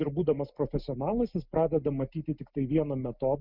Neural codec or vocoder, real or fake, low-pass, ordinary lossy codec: none; real; 5.4 kHz; Opus, 64 kbps